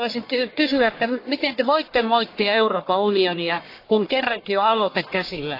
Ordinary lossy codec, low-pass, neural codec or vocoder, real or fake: AAC, 32 kbps; 5.4 kHz; codec, 44.1 kHz, 1.7 kbps, Pupu-Codec; fake